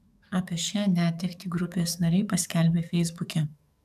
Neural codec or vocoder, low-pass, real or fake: codec, 44.1 kHz, 7.8 kbps, DAC; 14.4 kHz; fake